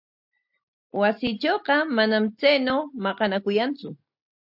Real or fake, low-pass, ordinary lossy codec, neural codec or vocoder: real; 5.4 kHz; AAC, 48 kbps; none